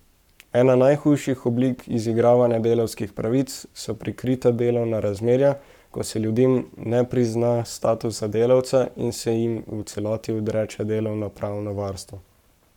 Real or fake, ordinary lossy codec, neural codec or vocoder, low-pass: fake; none; codec, 44.1 kHz, 7.8 kbps, Pupu-Codec; 19.8 kHz